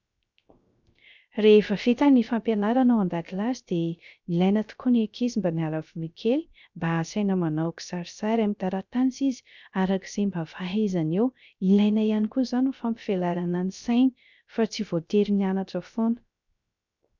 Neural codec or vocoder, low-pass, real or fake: codec, 16 kHz, 0.3 kbps, FocalCodec; 7.2 kHz; fake